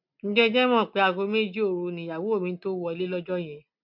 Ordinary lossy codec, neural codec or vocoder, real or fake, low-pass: MP3, 48 kbps; none; real; 5.4 kHz